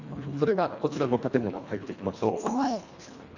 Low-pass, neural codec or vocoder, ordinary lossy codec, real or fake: 7.2 kHz; codec, 24 kHz, 1.5 kbps, HILCodec; none; fake